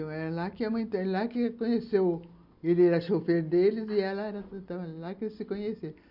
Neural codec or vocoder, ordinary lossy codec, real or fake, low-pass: none; none; real; 5.4 kHz